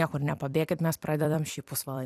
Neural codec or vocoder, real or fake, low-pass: vocoder, 44.1 kHz, 128 mel bands every 256 samples, BigVGAN v2; fake; 14.4 kHz